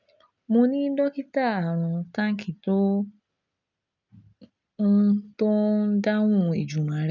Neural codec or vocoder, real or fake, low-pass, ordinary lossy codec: none; real; 7.2 kHz; none